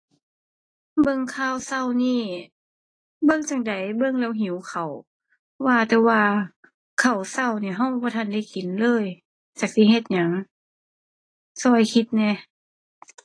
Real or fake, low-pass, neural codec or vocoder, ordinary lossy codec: real; 9.9 kHz; none; AAC, 32 kbps